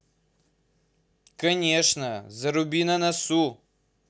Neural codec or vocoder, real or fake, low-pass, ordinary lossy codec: none; real; none; none